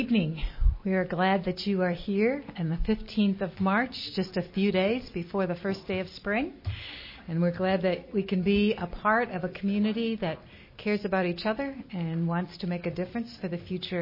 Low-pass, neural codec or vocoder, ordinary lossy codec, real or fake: 5.4 kHz; none; MP3, 24 kbps; real